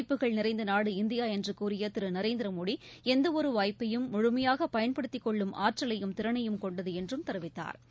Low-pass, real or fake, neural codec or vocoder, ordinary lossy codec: none; real; none; none